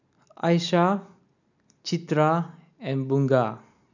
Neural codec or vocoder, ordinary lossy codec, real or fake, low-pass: none; none; real; 7.2 kHz